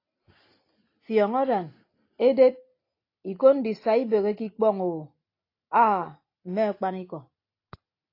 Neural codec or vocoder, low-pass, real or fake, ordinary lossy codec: none; 5.4 kHz; real; AAC, 32 kbps